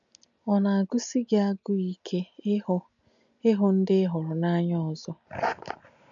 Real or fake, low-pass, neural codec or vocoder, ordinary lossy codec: real; 7.2 kHz; none; none